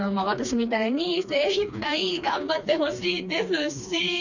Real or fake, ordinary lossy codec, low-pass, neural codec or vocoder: fake; none; 7.2 kHz; codec, 16 kHz, 2 kbps, FreqCodec, smaller model